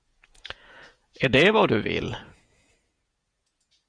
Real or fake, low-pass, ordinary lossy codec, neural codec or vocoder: fake; 9.9 kHz; Opus, 64 kbps; vocoder, 48 kHz, 128 mel bands, Vocos